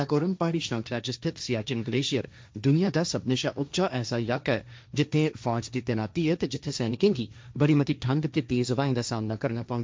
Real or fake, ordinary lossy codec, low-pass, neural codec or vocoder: fake; none; none; codec, 16 kHz, 1.1 kbps, Voila-Tokenizer